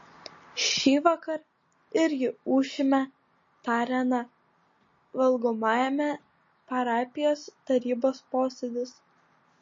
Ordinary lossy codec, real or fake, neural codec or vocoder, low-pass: MP3, 32 kbps; real; none; 7.2 kHz